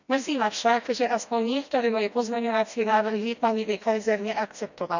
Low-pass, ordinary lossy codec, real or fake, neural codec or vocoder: 7.2 kHz; none; fake; codec, 16 kHz, 1 kbps, FreqCodec, smaller model